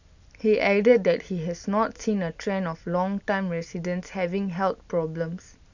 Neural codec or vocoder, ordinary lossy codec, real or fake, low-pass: none; none; real; 7.2 kHz